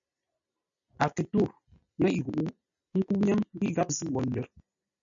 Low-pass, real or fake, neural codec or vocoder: 7.2 kHz; real; none